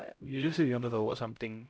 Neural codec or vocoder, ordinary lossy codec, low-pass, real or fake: codec, 16 kHz, 0.5 kbps, X-Codec, HuBERT features, trained on LibriSpeech; none; none; fake